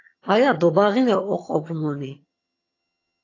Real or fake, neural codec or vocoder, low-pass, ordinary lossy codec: fake; vocoder, 22.05 kHz, 80 mel bands, HiFi-GAN; 7.2 kHz; AAC, 32 kbps